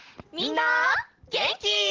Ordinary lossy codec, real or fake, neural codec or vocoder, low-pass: Opus, 16 kbps; real; none; 7.2 kHz